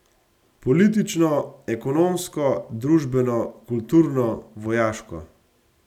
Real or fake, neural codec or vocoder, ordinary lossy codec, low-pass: real; none; none; 19.8 kHz